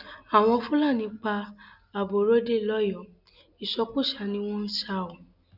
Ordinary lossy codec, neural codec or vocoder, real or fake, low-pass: none; none; real; 5.4 kHz